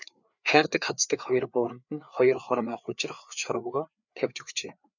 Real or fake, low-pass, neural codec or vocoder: fake; 7.2 kHz; codec, 16 kHz, 4 kbps, FreqCodec, larger model